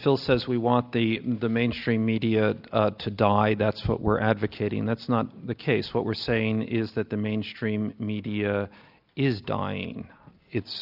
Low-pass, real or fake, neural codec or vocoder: 5.4 kHz; real; none